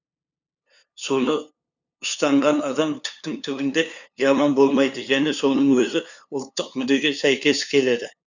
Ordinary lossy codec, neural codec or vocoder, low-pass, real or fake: none; codec, 16 kHz, 2 kbps, FunCodec, trained on LibriTTS, 25 frames a second; 7.2 kHz; fake